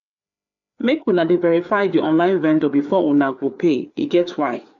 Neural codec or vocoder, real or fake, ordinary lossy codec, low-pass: codec, 16 kHz, 4 kbps, FreqCodec, larger model; fake; none; 7.2 kHz